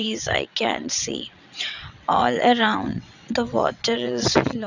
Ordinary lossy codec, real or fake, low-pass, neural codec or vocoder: none; fake; 7.2 kHz; vocoder, 22.05 kHz, 80 mel bands, WaveNeXt